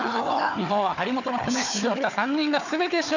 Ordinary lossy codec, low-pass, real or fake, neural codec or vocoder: none; 7.2 kHz; fake; codec, 16 kHz, 4 kbps, FunCodec, trained on Chinese and English, 50 frames a second